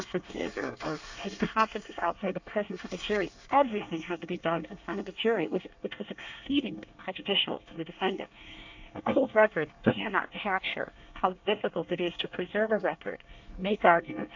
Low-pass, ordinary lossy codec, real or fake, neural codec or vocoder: 7.2 kHz; AAC, 48 kbps; fake; codec, 24 kHz, 1 kbps, SNAC